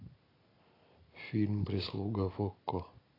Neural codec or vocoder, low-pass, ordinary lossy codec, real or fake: none; 5.4 kHz; AAC, 24 kbps; real